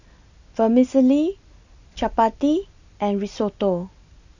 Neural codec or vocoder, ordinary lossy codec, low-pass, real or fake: none; none; 7.2 kHz; real